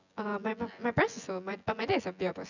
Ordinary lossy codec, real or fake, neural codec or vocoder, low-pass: none; fake; vocoder, 24 kHz, 100 mel bands, Vocos; 7.2 kHz